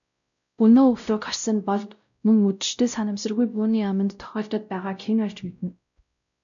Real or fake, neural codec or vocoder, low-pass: fake; codec, 16 kHz, 0.5 kbps, X-Codec, WavLM features, trained on Multilingual LibriSpeech; 7.2 kHz